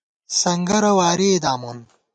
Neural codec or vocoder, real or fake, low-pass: none; real; 9.9 kHz